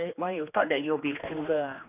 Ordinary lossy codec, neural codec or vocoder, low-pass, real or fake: none; codec, 16 kHz, 2 kbps, X-Codec, HuBERT features, trained on general audio; 3.6 kHz; fake